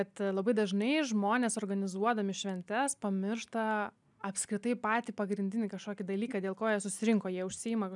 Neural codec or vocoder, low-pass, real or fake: none; 10.8 kHz; real